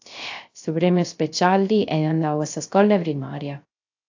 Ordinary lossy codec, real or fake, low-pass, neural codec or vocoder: AAC, 48 kbps; fake; 7.2 kHz; codec, 16 kHz, 0.3 kbps, FocalCodec